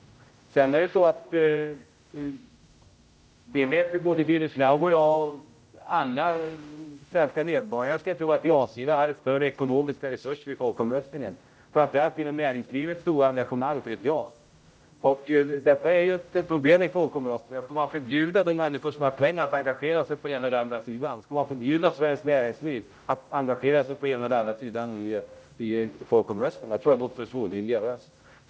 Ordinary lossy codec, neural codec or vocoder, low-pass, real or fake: none; codec, 16 kHz, 0.5 kbps, X-Codec, HuBERT features, trained on general audio; none; fake